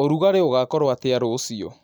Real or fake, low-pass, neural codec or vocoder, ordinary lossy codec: real; none; none; none